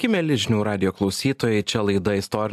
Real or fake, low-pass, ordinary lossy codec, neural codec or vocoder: real; 14.4 kHz; AAC, 96 kbps; none